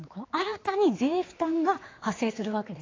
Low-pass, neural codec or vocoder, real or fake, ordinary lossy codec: 7.2 kHz; codec, 16 kHz, 4 kbps, X-Codec, HuBERT features, trained on general audio; fake; AAC, 32 kbps